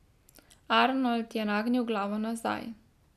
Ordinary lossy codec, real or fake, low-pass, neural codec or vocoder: none; real; 14.4 kHz; none